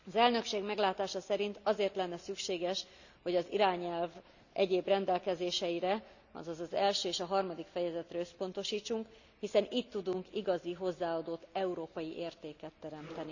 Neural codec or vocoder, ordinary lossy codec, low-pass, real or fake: none; none; 7.2 kHz; real